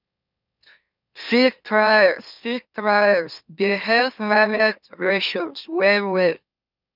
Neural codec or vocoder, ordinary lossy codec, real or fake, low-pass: autoencoder, 44.1 kHz, a latent of 192 numbers a frame, MeloTTS; none; fake; 5.4 kHz